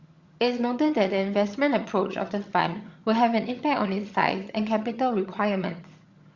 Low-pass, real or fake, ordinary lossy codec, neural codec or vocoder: 7.2 kHz; fake; Opus, 64 kbps; vocoder, 22.05 kHz, 80 mel bands, HiFi-GAN